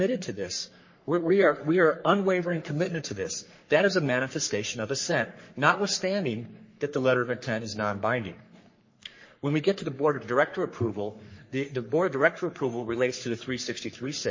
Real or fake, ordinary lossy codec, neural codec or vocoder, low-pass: fake; MP3, 32 kbps; codec, 44.1 kHz, 3.4 kbps, Pupu-Codec; 7.2 kHz